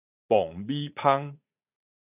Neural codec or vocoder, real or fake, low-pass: vocoder, 44.1 kHz, 80 mel bands, Vocos; fake; 3.6 kHz